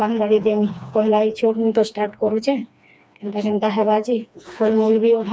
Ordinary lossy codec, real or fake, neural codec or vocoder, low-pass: none; fake; codec, 16 kHz, 2 kbps, FreqCodec, smaller model; none